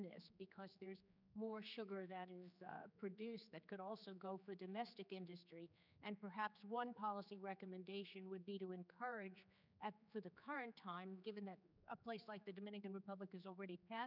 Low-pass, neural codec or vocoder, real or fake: 5.4 kHz; codec, 16 kHz, 4 kbps, X-Codec, HuBERT features, trained on general audio; fake